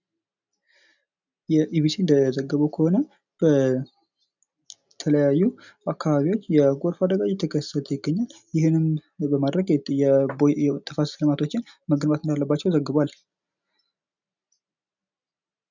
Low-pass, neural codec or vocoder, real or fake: 7.2 kHz; none; real